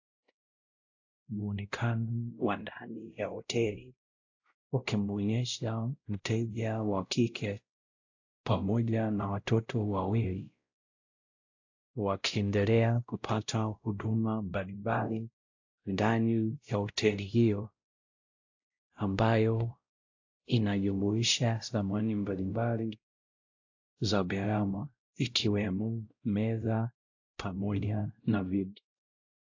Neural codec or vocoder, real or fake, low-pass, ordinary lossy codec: codec, 16 kHz, 0.5 kbps, X-Codec, WavLM features, trained on Multilingual LibriSpeech; fake; 7.2 kHz; AAC, 48 kbps